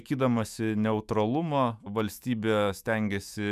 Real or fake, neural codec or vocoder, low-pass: fake; autoencoder, 48 kHz, 128 numbers a frame, DAC-VAE, trained on Japanese speech; 14.4 kHz